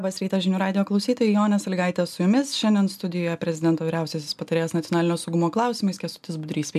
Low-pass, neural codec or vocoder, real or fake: 14.4 kHz; none; real